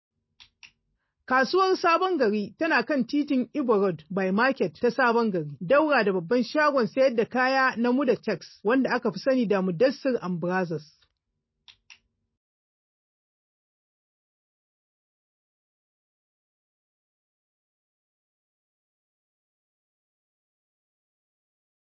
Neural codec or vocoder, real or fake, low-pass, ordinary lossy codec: none; real; 7.2 kHz; MP3, 24 kbps